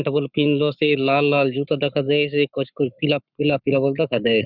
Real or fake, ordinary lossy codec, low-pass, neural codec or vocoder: fake; none; 5.4 kHz; codec, 44.1 kHz, 7.8 kbps, Pupu-Codec